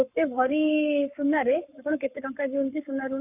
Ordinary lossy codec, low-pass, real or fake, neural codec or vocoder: none; 3.6 kHz; fake; codec, 44.1 kHz, 7.8 kbps, Pupu-Codec